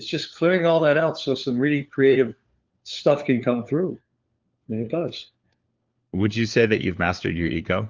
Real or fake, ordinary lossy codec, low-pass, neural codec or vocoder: fake; Opus, 32 kbps; 7.2 kHz; codec, 16 kHz, 4 kbps, FunCodec, trained on LibriTTS, 50 frames a second